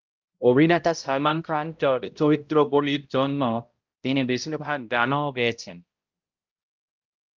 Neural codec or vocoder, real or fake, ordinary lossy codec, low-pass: codec, 16 kHz, 0.5 kbps, X-Codec, HuBERT features, trained on balanced general audio; fake; Opus, 24 kbps; 7.2 kHz